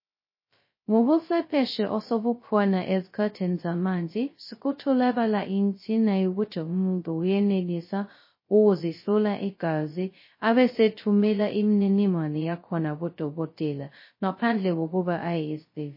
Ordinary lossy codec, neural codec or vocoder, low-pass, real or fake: MP3, 24 kbps; codec, 16 kHz, 0.2 kbps, FocalCodec; 5.4 kHz; fake